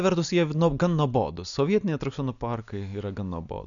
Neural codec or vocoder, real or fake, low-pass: none; real; 7.2 kHz